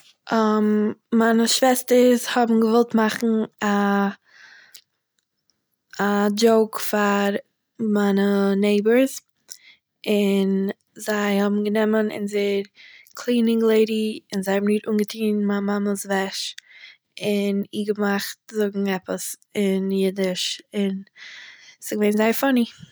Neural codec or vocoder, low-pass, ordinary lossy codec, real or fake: none; none; none; real